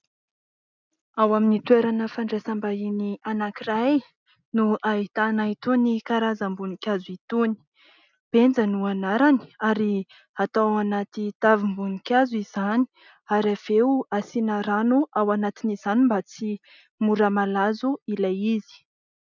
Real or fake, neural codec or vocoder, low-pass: real; none; 7.2 kHz